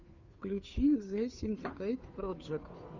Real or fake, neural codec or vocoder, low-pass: fake; codec, 16 kHz, 2 kbps, FunCodec, trained on Chinese and English, 25 frames a second; 7.2 kHz